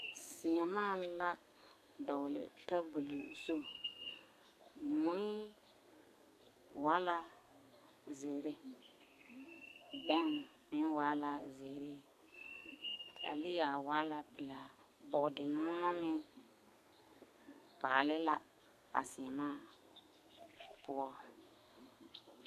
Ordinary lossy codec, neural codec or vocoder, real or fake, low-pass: AAC, 96 kbps; codec, 32 kHz, 1.9 kbps, SNAC; fake; 14.4 kHz